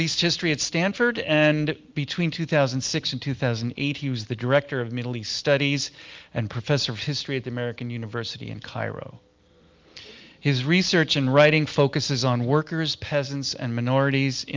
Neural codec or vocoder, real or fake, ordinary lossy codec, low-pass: none; real; Opus, 32 kbps; 7.2 kHz